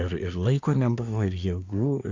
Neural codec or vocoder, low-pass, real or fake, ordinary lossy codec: codec, 24 kHz, 1 kbps, SNAC; 7.2 kHz; fake; AAC, 48 kbps